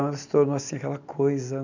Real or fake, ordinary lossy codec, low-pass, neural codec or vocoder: real; none; 7.2 kHz; none